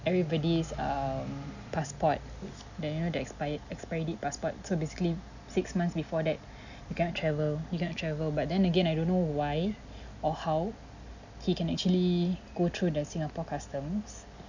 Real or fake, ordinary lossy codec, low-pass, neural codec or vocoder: real; none; 7.2 kHz; none